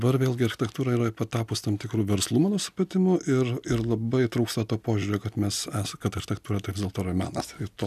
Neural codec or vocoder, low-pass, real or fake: none; 14.4 kHz; real